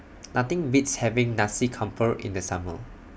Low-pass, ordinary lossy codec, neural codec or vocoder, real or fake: none; none; none; real